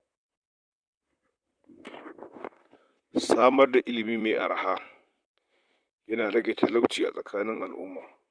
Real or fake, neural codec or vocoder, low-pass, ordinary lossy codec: fake; vocoder, 44.1 kHz, 128 mel bands, Pupu-Vocoder; 9.9 kHz; none